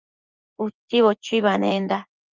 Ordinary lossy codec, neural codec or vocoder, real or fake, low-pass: Opus, 32 kbps; vocoder, 24 kHz, 100 mel bands, Vocos; fake; 7.2 kHz